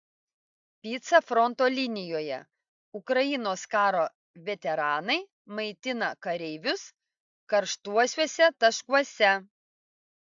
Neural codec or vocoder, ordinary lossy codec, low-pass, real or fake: none; MP3, 64 kbps; 7.2 kHz; real